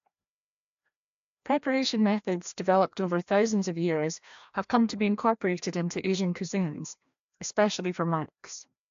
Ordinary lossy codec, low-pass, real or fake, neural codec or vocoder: AAC, 64 kbps; 7.2 kHz; fake; codec, 16 kHz, 1 kbps, FreqCodec, larger model